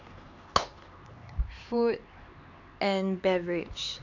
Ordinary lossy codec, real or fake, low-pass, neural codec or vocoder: none; fake; 7.2 kHz; codec, 16 kHz, 4 kbps, X-Codec, HuBERT features, trained on LibriSpeech